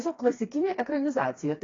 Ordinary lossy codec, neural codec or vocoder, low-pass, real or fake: AAC, 32 kbps; codec, 16 kHz, 2 kbps, FreqCodec, smaller model; 7.2 kHz; fake